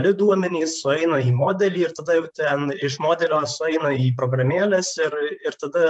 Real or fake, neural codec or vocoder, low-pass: fake; vocoder, 44.1 kHz, 128 mel bands, Pupu-Vocoder; 10.8 kHz